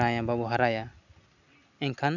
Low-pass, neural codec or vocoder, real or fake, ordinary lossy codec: 7.2 kHz; none; real; none